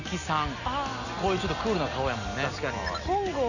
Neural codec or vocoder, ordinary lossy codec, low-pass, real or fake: none; none; 7.2 kHz; real